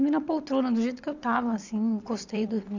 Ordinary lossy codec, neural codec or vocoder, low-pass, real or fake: none; vocoder, 22.05 kHz, 80 mel bands, WaveNeXt; 7.2 kHz; fake